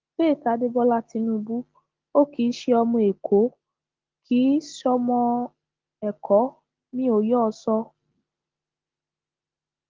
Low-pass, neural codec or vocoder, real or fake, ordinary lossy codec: 7.2 kHz; none; real; Opus, 16 kbps